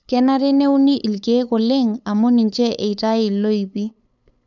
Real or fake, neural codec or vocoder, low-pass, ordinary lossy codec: fake; codec, 16 kHz, 8 kbps, FunCodec, trained on LibriTTS, 25 frames a second; 7.2 kHz; none